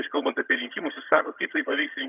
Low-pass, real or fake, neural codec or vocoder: 3.6 kHz; fake; vocoder, 22.05 kHz, 80 mel bands, HiFi-GAN